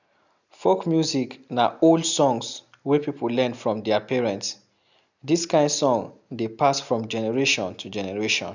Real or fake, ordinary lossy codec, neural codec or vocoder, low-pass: real; none; none; 7.2 kHz